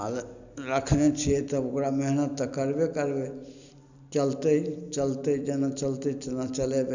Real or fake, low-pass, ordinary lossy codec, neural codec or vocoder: real; 7.2 kHz; none; none